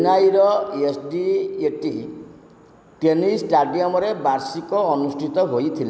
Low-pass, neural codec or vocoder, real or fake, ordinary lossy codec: none; none; real; none